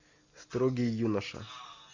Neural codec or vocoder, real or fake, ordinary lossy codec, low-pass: none; real; AAC, 48 kbps; 7.2 kHz